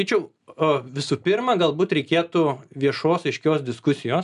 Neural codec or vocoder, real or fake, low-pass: none; real; 10.8 kHz